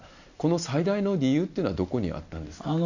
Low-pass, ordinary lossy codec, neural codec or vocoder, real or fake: 7.2 kHz; none; none; real